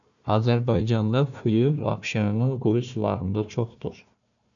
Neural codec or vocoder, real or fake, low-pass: codec, 16 kHz, 1 kbps, FunCodec, trained on Chinese and English, 50 frames a second; fake; 7.2 kHz